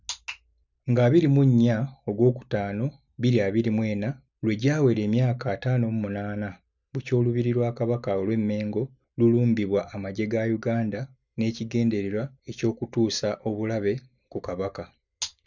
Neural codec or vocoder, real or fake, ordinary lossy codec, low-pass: none; real; none; 7.2 kHz